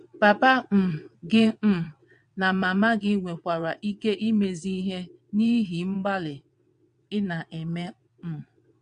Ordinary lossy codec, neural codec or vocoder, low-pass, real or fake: MP3, 64 kbps; vocoder, 22.05 kHz, 80 mel bands, WaveNeXt; 9.9 kHz; fake